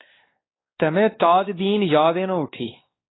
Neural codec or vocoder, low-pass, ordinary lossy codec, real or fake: codec, 16 kHz, 2 kbps, X-Codec, WavLM features, trained on Multilingual LibriSpeech; 7.2 kHz; AAC, 16 kbps; fake